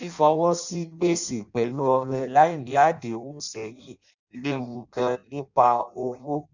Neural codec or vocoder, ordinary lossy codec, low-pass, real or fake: codec, 16 kHz in and 24 kHz out, 0.6 kbps, FireRedTTS-2 codec; none; 7.2 kHz; fake